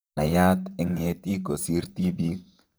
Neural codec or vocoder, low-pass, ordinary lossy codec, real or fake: vocoder, 44.1 kHz, 128 mel bands, Pupu-Vocoder; none; none; fake